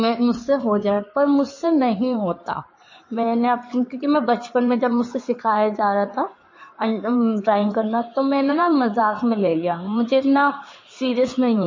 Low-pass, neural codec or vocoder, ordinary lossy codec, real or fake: 7.2 kHz; codec, 16 kHz in and 24 kHz out, 2.2 kbps, FireRedTTS-2 codec; MP3, 32 kbps; fake